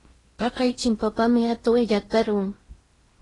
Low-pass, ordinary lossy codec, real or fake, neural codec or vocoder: 10.8 kHz; AAC, 32 kbps; fake; codec, 16 kHz in and 24 kHz out, 0.8 kbps, FocalCodec, streaming, 65536 codes